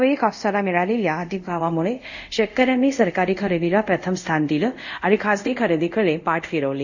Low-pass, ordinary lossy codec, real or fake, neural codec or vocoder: 7.2 kHz; none; fake; codec, 24 kHz, 0.5 kbps, DualCodec